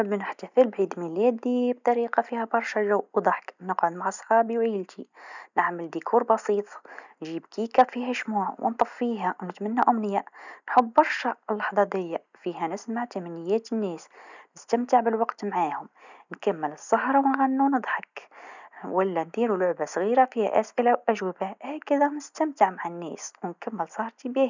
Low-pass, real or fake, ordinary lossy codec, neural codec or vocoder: 7.2 kHz; real; none; none